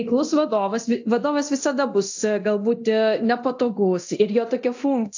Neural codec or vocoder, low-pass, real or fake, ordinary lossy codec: codec, 24 kHz, 0.9 kbps, DualCodec; 7.2 kHz; fake; AAC, 48 kbps